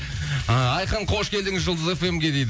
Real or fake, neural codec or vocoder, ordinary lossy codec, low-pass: real; none; none; none